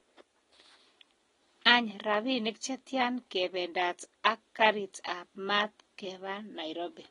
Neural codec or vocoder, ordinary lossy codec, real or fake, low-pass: none; AAC, 32 kbps; real; 10.8 kHz